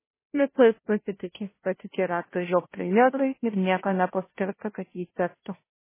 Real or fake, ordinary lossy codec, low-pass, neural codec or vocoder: fake; MP3, 16 kbps; 3.6 kHz; codec, 16 kHz, 0.5 kbps, FunCodec, trained on Chinese and English, 25 frames a second